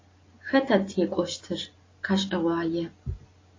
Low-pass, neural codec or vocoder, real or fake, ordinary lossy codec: 7.2 kHz; none; real; AAC, 32 kbps